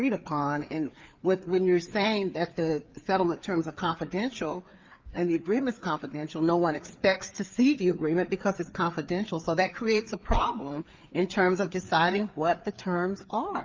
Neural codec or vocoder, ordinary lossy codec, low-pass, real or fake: codec, 16 kHz, 4 kbps, FreqCodec, larger model; Opus, 32 kbps; 7.2 kHz; fake